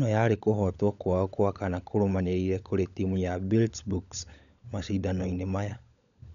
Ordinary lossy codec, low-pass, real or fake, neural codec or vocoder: none; 7.2 kHz; fake; codec, 16 kHz, 16 kbps, FunCodec, trained on LibriTTS, 50 frames a second